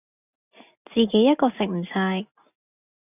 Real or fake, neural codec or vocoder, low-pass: real; none; 3.6 kHz